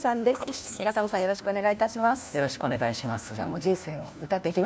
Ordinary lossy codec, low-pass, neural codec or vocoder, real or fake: none; none; codec, 16 kHz, 1 kbps, FunCodec, trained on LibriTTS, 50 frames a second; fake